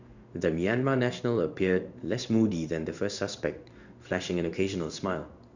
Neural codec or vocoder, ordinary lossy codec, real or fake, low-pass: codec, 16 kHz in and 24 kHz out, 1 kbps, XY-Tokenizer; none; fake; 7.2 kHz